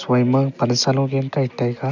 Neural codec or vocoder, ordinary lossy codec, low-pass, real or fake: none; none; 7.2 kHz; real